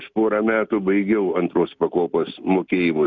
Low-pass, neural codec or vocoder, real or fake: 7.2 kHz; none; real